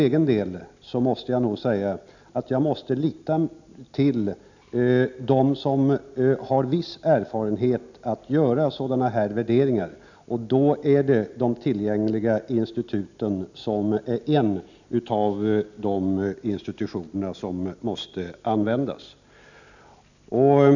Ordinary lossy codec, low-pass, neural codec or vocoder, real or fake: none; 7.2 kHz; none; real